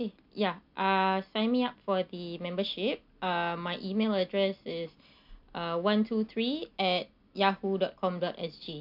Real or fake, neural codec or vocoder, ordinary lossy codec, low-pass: real; none; none; 5.4 kHz